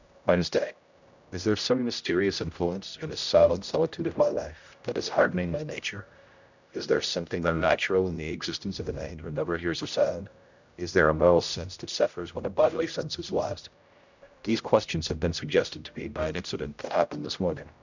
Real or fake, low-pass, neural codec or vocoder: fake; 7.2 kHz; codec, 16 kHz, 0.5 kbps, X-Codec, HuBERT features, trained on general audio